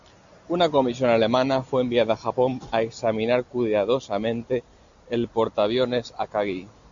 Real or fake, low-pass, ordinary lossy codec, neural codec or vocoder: real; 7.2 kHz; MP3, 64 kbps; none